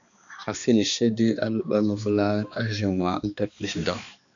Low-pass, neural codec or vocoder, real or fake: 7.2 kHz; codec, 16 kHz, 2 kbps, X-Codec, HuBERT features, trained on balanced general audio; fake